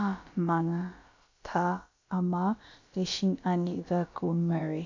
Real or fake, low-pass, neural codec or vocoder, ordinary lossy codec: fake; 7.2 kHz; codec, 16 kHz, about 1 kbps, DyCAST, with the encoder's durations; MP3, 64 kbps